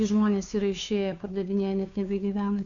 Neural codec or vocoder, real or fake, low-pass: codec, 16 kHz, 2 kbps, FunCodec, trained on Chinese and English, 25 frames a second; fake; 7.2 kHz